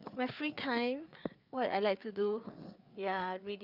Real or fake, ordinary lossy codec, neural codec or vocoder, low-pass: fake; none; codec, 16 kHz, 4 kbps, FreqCodec, larger model; 5.4 kHz